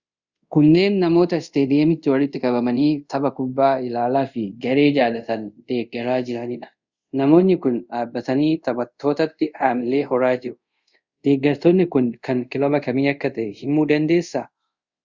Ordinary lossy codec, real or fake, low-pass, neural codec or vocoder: Opus, 64 kbps; fake; 7.2 kHz; codec, 24 kHz, 0.5 kbps, DualCodec